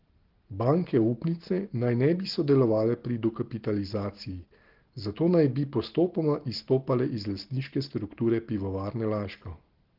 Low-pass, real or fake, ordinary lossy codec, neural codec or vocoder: 5.4 kHz; real; Opus, 16 kbps; none